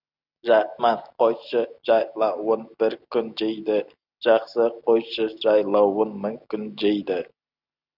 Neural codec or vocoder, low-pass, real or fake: none; 5.4 kHz; real